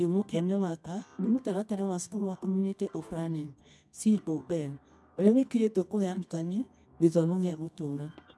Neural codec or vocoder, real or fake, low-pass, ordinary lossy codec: codec, 24 kHz, 0.9 kbps, WavTokenizer, medium music audio release; fake; none; none